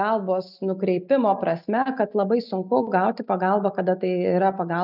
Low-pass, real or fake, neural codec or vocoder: 5.4 kHz; real; none